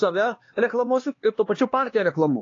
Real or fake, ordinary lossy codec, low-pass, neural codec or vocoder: fake; AAC, 32 kbps; 7.2 kHz; codec, 16 kHz, 2 kbps, X-Codec, HuBERT features, trained on LibriSpeech